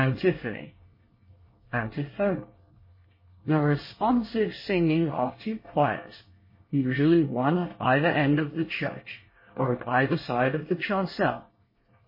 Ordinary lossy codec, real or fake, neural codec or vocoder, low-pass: MP3, 24 kbps; fake; codec, 24 kHz, 1 kbps, SNAC; 5.4 kHz